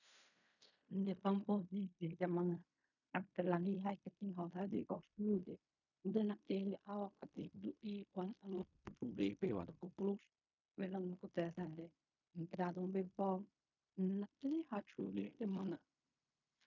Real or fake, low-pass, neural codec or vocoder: fake; 7.2 kHz; codec, 16 kHz in and 24 kHz out, 0.4 kbps, LongCat-Audio-Codec, fine tuned four codebook decoder